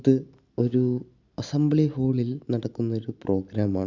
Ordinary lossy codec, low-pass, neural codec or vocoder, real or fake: Opus, 64 kbps; 7.2 kHz; none; real